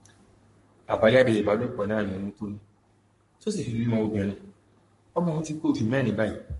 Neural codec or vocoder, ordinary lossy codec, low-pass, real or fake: codec, 44.1 kHz, 3.4 kbps, Pupu-Codec; MP3, 48 kbps; 14.4 kHz; fake